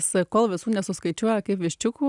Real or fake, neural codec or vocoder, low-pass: real; none; 10.8 kHz